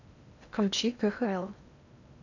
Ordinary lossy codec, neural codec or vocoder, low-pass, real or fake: none; codec, 16 kHz in and 24 kHz out, 0.8 kbps, FocalCodec, streaming, 65536 codes; 7.2 kHz; fake